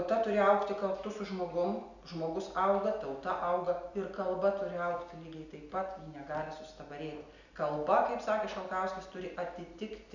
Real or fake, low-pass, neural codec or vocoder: real; 7.2 kHz; none